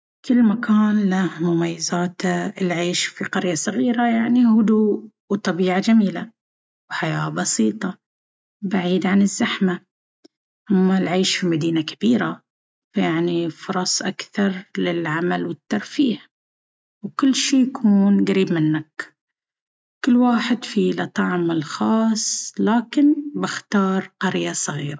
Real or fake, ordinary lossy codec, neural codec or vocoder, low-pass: real; none; none; none